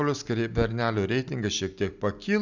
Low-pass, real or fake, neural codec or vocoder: 7.2 kHz; real; none